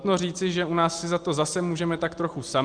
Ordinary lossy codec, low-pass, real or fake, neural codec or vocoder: Opus, 32 kbps; 9.9 kHz; real; none